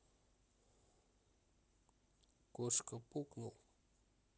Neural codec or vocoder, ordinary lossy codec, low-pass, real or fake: none; none; none; real